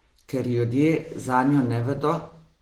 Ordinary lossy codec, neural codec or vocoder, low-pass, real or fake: Opus, 16 kbps; none; 19.8 kHz; real